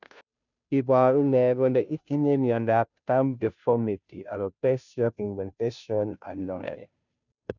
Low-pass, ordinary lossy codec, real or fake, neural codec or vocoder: 7.2 kHz; none; fake; codec, 16 kHz, 0.5 kbps, FunCodec, trained on Chinese and English, 25 frames a second